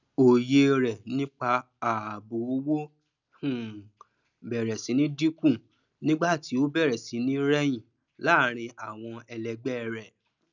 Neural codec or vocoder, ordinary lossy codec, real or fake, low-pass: none; none; real; 7.2 kHz